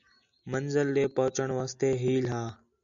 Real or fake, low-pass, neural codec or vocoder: real; 7.2 kHz; none